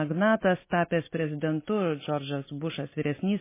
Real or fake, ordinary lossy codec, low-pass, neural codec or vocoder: real; MP3, 16 kbps; 3.6 kHz; none